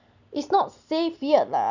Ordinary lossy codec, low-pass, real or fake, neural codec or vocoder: none; 7.2 kHz; real; none